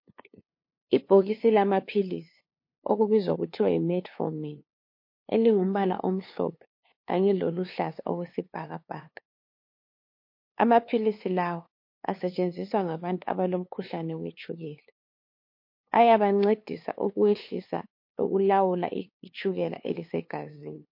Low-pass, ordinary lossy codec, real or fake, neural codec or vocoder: 5.4 kHz; MP3, 32 kbps; fake; codec, 16 kHz, 2 kbps, FunCodec, trained on LibriTTS, 25 frames a second